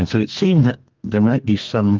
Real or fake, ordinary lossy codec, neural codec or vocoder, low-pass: fake; Opus, 32 kbps; codec, 32 kHz, 1.9 kbps, SNAC; 7.2 kHz